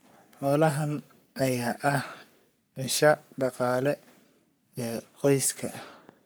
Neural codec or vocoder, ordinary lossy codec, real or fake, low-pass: codec, 44.1 kHz, 3.4 kbps, Pupu-Codec; none; fake; none